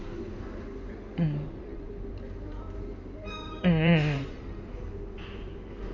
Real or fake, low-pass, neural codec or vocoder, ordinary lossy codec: fake; 7.2 kHz; codec, 16 kHz in and 24 kHz out, 2.2 kbps, FireRedTTS-2 codec; none